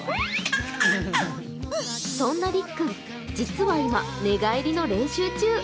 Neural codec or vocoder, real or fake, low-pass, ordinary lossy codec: none; real; none; none